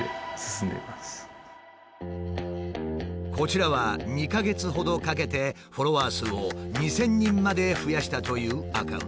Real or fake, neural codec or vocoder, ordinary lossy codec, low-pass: real; none; none; none